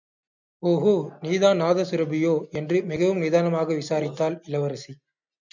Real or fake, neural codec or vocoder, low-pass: real; none; 7.2 kHz